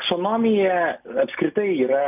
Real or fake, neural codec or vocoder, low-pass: real; none; 3.6 kHz